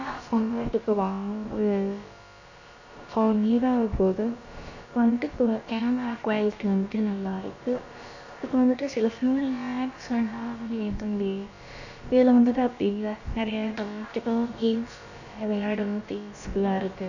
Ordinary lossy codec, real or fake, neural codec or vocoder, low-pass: none; fake; codec, 16 kHz, about 1 kbps, DyCAST, with the encoder's durations; 7.2 kHz